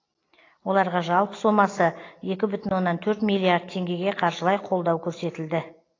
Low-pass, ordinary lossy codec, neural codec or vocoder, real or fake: 7.2 kHz; AAC, 32 kbps; none; real